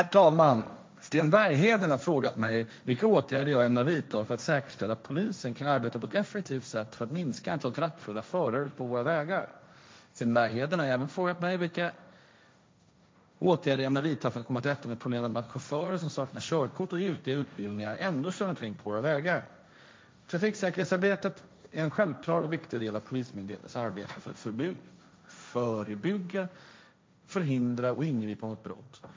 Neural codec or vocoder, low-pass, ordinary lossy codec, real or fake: codec, 16 kHz, 1.1 kbps, Voila-Tokenizer; none; none; fake